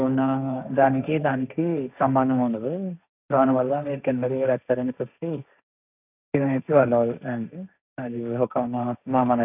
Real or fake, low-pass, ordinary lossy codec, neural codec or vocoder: fake; 3.6 kHz; none; codec, 16 kHz, 1.1 kbps, Voila-Tokenizer